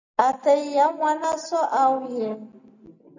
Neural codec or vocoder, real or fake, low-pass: none; real; 7.2 kHz